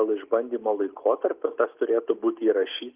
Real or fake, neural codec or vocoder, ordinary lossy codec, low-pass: real; none; Opus, 24 kbps; 3.6 kHz